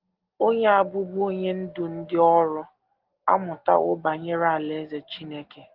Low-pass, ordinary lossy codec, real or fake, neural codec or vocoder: 5.4 kHz; Opus, 16 kbps; real; none